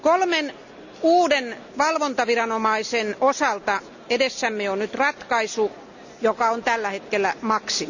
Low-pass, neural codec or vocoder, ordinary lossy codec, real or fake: 7.2 kHz; none; none; real